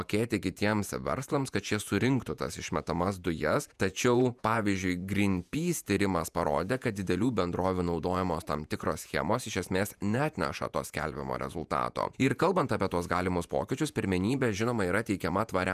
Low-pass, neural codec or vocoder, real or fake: 14.4 kHz; vocoder, 48 kHz, 128 mel bands, Vocos; fake